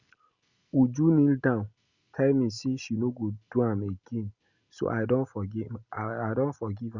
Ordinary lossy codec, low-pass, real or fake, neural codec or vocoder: Opus, 64 kbps; 7.2 kHz; real; none